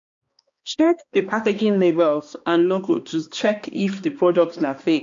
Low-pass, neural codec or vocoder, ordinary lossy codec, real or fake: 7.2 kHz; codec, 16 kHz, 2 kbps, X-Codec, HuBERT features, trained on balanced general audio; MP3, 48 kbps; fake